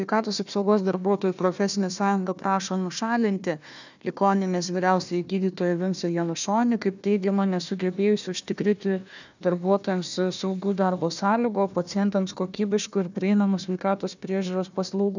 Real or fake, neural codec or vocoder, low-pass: fake; codec, 16 kHz, 1 kbps, FunCodec, trained on Chinese and English, 50 frames a second; 7.2 kHz